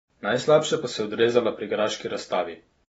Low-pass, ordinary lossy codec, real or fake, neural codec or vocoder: 19.8 kHz; AAC, 24 kbps; real; none